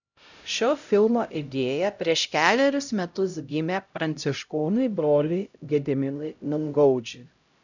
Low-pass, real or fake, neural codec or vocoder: 7.2 kHz; fake; codec, 16 kHz, 0.5 kbps, X-Codec, HuBERT features, trained on LibriSpeech